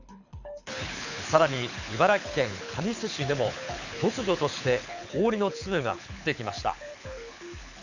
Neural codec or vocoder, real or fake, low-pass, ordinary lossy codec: codec, 24 kHz, 6 kbps, HILCodec; fake; 7.2 kHz; none